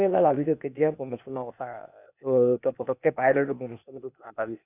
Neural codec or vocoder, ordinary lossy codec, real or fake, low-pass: codec, 16 kHz, 0.8 kbps, ZipCodec; none; fake; 3.6 kHz